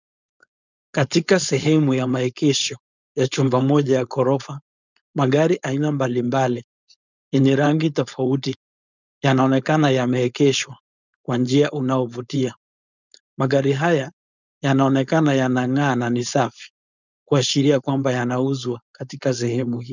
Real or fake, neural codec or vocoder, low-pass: fake; codec, 16 kHz, 4.8 kbps, FACodec; 7.2 kHz